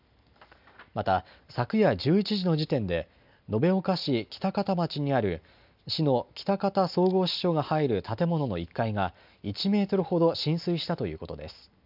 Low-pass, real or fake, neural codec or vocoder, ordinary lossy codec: 5.4 kHz; real; none; none